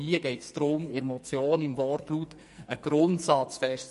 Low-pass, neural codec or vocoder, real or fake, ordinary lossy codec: 14.4 kHz; codec, 44.1 kHz, 2.6 kbps, SNAC; fake; MP3, 48 kbps